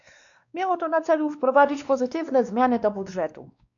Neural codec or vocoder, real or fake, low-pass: codec, 16 kHz, 2 kbps, X-Codec, WavLM features, trained on Multilingual LibriSpeech; fake; 7.2 kHz